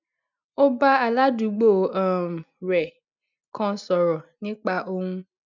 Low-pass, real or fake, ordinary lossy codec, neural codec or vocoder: 7.2 kHz; real; none; none